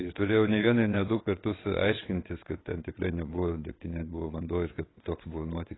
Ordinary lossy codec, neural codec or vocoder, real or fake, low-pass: AAC, 16 kbps; vocoder, 22.05 kHz, 80 mel bands, WaveNeXt; fake; 7.2 kHz